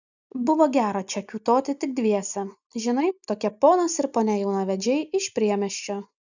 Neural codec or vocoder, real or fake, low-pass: none; real; 7.2 kHz